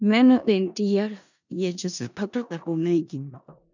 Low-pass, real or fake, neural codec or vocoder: 7.2 kHz; fake; codec, 16 kHz in and 24 kHz out, 0.4 kbps, LongCat-Audio-Codec, four codebook decoder